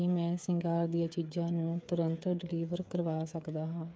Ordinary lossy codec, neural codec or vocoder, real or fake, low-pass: none; codec, 16 kHz, 8 kbps, FreqCodec, smaller model; fake; none